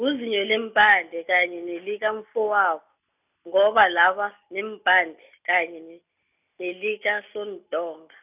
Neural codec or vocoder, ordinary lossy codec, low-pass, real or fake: none; none; 3.6 kHz; real